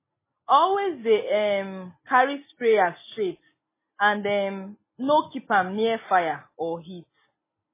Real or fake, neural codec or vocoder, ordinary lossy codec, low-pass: real; none; MP3, 16 kbps; 3.6 kHz